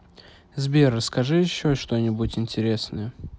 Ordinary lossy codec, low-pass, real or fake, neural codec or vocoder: none; none; real; none